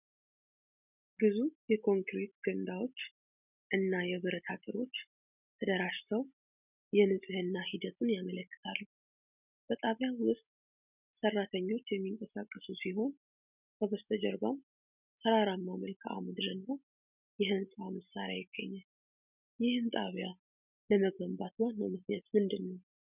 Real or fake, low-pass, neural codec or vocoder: real; 3.6 kHz; none